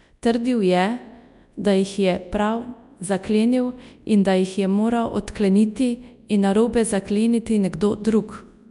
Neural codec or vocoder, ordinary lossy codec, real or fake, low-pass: codec, 24 kHz, 0.9 kbps, WavTokenizer, large speech release; none; fake; 10.8 kHz